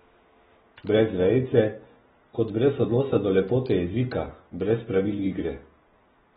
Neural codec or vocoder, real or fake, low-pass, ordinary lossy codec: none; real; 19.8 kHz; AAC, 16 kbps